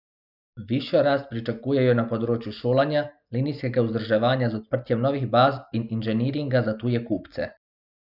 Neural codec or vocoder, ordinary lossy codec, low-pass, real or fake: none; none; 5.4 kHz; real